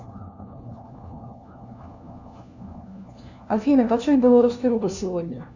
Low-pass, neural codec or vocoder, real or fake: 7.2 kHz; codec, 16 kHz, 1 kbps, FunCodec, trained on LibriTTS, 50 frames a second; fake